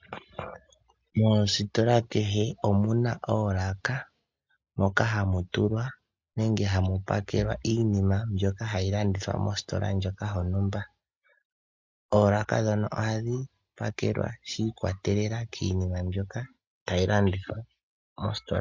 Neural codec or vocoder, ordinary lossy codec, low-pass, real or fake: none; MP3, 64 kbps; 7.2 kHz; real